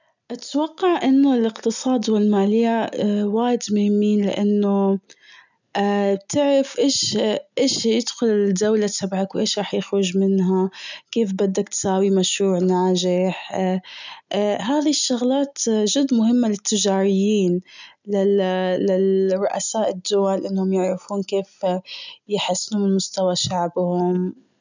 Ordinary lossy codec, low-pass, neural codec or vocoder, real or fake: none; 7.2 kHz; none; real